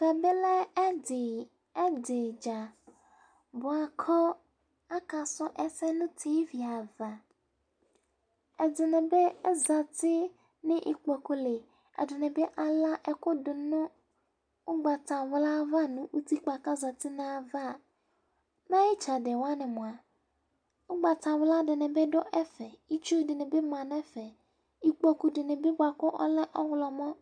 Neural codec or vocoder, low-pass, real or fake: none; 9.9 kHz; real